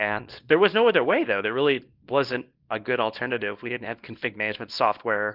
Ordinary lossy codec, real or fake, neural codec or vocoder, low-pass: Opus, 32 kbps; fake; codec, 24 kHz, 0.9 kbps, WavTokenizer, small release; 5.4 kHz